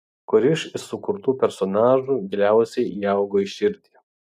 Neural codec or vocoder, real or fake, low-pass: none; real; 14.4 kHz